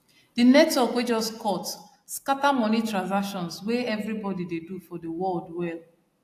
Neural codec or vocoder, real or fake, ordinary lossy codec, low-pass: none; real; AAC, 64 kbps; 14.4 kHz